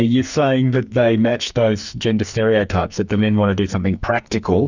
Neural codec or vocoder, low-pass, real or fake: codec, 44.1 kHz, 2.6 kbps, SNAC; 7.2 kHz; fake